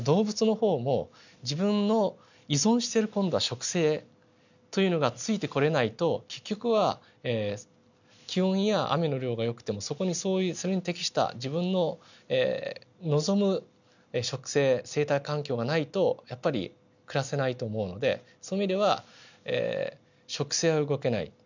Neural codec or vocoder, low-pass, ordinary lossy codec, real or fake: none; 7.2 kHz; none; real